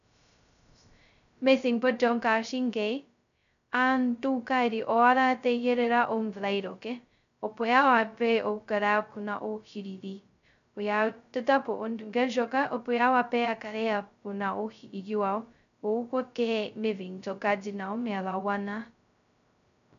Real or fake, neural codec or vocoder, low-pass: fake; codec, 16 kHz, 0.2 kbps, FocalCodec; 7.2 kHz